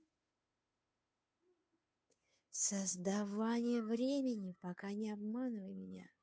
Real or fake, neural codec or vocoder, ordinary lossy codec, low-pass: fake; codec, 16 kHz, 2 kbps, FunCodec, trained on Chinese and English, 25 frames a second; none; none